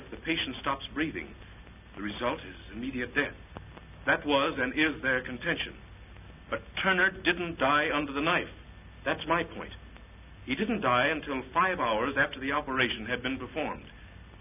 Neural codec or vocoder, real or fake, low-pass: none; real; 3.6 kHz